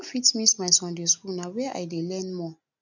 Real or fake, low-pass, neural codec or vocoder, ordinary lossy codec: real; 7.2 kHz; none; none